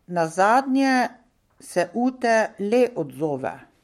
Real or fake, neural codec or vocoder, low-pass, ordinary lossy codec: fake; codec, 44.1 kHz, 7.8 kbps, Pupu-Codec; 19.8 kHz; MP3, 64 kbps